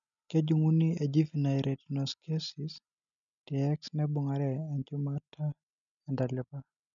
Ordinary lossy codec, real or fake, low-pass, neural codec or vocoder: none; real; 7.2 kHz; none